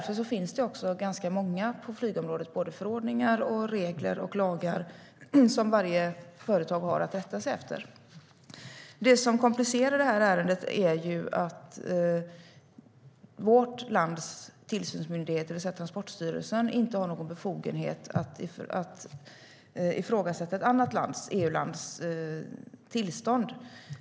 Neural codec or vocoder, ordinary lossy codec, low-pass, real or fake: none; none; none; real